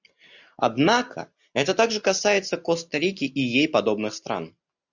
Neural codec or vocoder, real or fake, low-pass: none; real; 7.2 kHz